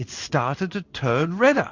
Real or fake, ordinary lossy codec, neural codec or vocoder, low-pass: fake; Opus, 64 kbps; codec, 16 kHz in and 24 kHz out, 1 kbps, XY-Tokenizer; 7.2 kHz